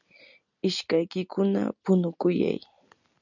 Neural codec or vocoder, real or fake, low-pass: none; real; 7.2 kHz